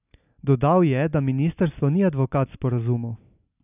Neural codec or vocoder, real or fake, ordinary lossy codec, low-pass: none; real; none; 3.6 kHz